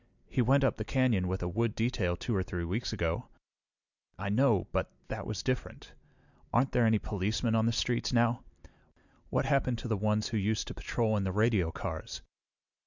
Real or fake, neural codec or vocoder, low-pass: real; none; 7.2 kHz